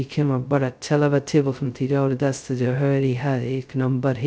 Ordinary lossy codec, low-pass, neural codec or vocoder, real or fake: none; none; codec, 16 kHz, 0.2 kbps, FocalCodec; fake